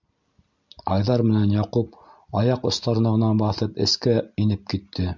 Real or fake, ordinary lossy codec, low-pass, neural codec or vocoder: real; MP3, 64 kbps; 7.2 kHz; none